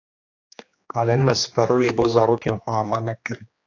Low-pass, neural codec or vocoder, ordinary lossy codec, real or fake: 7.2 kHz; codec, 16 kHz, 2 kbps, X-Codec, HuBERT features, trained on general audio; AAC, 48 kbps; fake